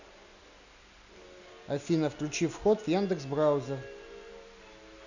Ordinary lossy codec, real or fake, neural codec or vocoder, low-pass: none; real; none; 7.2 kHz